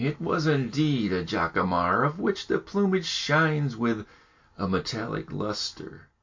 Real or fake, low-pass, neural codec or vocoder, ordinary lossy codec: real; 7.2 kHz; none; MP3, 48 kbps